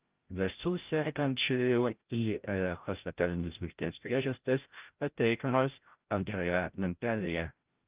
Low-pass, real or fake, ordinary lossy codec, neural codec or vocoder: 3.6 kHz; fake; Opus, 32 kbps; codec, 16 kHz, 0.5 kbps, FreqCodec, larger model